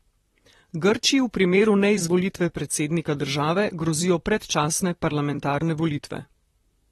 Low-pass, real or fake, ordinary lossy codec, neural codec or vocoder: 19.8 kHz; fake; AAC, 32 kbps; vocoder, 44.1 kHz, 128 mel bands, Pupu-Vocoder